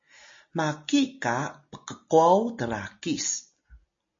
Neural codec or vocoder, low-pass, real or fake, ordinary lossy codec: none; 7.2 kHz; real; MP3, 32 kbps